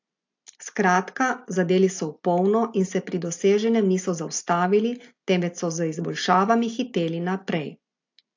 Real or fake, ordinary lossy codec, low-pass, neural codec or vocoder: real; AAC, 48 kbps; 7.2 kHz; none